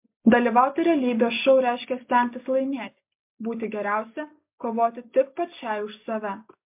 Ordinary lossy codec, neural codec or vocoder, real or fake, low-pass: MP3, 32 kbps; none; real; 3.6 kHz